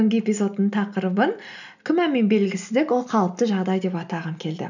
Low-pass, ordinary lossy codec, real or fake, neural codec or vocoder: 7.2 kHz; none; real; none